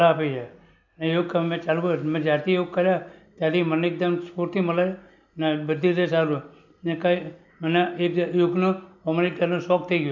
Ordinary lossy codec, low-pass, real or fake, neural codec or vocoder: none; 7.2 kHz; real; none